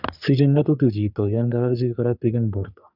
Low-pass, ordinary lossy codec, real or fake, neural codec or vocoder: 5.4 kHz; none; fake; codec, 44.1 kHz, 3.4 kbps, Pupu-Codec